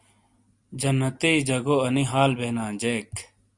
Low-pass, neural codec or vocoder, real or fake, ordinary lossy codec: 10.8 kHz; none; real; Opus, 64 kbps